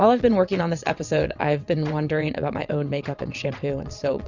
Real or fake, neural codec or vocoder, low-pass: fake; vocoder, 22.05 kHz, 80 mel bands, WaveNeXt; 7.2 kHz